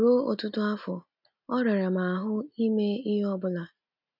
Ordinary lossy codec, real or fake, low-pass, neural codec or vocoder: none; real; 5.4 kHz; none